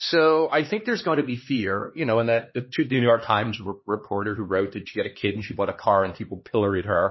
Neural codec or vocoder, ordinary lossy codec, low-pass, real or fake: codec, 16 kHz, 2 kbps, X-Codec, HuBERT features, trained on LibriSpeech; MP3, 24 kbps; 7.2 kHz; fake